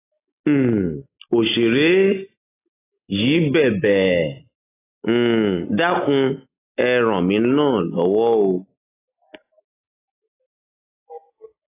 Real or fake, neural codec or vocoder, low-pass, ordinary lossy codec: real; none; 3.6 kHz; AAC, 16 kbps